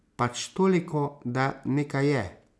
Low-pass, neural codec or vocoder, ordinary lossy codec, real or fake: none; none; none; real